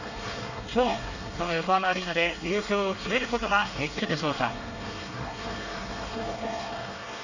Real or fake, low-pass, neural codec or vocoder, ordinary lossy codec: fake; 7.2 kHz; codec, 24 kHz, 1 kbps, SNAC; none